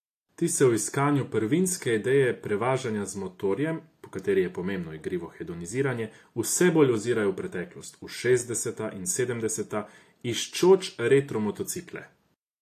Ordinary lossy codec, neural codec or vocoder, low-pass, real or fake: AAC, 64 kbps; none; 14.4 kHz; real